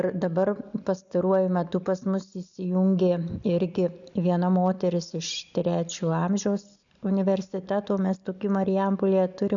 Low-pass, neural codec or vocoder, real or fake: 7.2 kHz; none; real